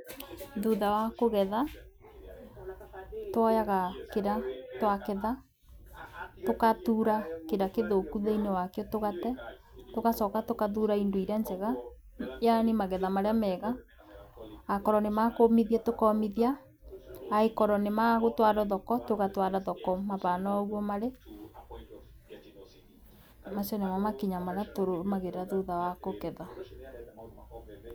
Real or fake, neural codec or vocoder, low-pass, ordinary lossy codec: real; none; none; none